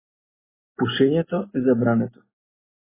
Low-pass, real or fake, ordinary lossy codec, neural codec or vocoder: 3.6 kHz; real; MP3, 16 kbps; none